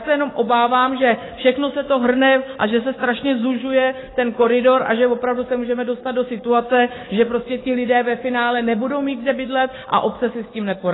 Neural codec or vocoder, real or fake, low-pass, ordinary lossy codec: autoencoder, 48 kHz, 128 numbers a frame, DAC-VAE, trained on Japanese speech; fake; 7.2 kHz; AAC, 16 kbps